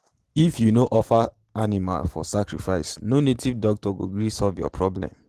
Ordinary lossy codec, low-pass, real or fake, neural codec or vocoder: Opus, 16 kbps; 14.4 kHz; real; none